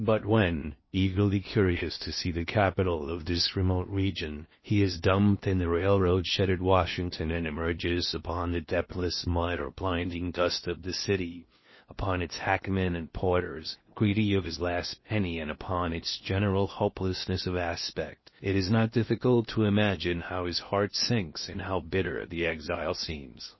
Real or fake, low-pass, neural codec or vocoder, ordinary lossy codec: fake; 7.2 kHz; codec, 16 kHz in and 24 kHz out, 0.8 kbps, FocalCodec, streaming, 65536 codes; MP3, 24 kbps